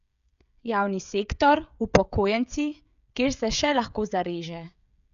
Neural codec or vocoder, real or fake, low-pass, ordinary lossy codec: codec, 16 kHz, 16 kbps, FreqCodec, smaller model; fake; 7.2 kHz; none